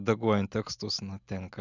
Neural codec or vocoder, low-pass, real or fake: none; 7.2 kHz; real